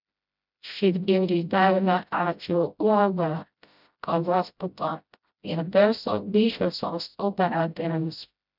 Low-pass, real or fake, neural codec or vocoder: 5.4 kHz; fake; codec, 16 kHz, 0.5 kbps, FreqCodec, smaller model